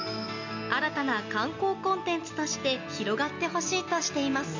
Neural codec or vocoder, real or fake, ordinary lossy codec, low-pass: none; real; none; 7.2 kHz